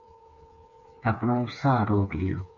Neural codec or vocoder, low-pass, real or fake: codec, 16 kHz, 4 kbps, FreqCodec, smaller model; 7.2 kHz; fake